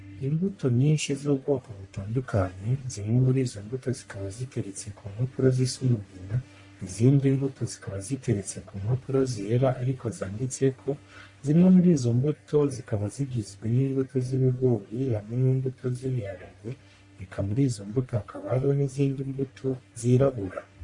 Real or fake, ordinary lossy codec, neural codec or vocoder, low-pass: fake; MP3, 48 kbps; codec, 44.1 kHz, 1.7 kbps, Pupu-Codec; 10.8 kHz